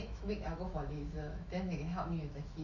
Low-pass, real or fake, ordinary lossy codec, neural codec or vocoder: 7.2 kHz; fake; Opus, 64 kbps; autoencoder, 48 kHz, 128 numbers a frame, DAC-VAE, trained on Japanese speech